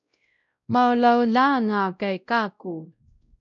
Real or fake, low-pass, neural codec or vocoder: fake; 7.2 kHz; codec, 16 kHz, 0.5 kbps, X-Codec, WavLM features, trained on Multilingual LibriSpeech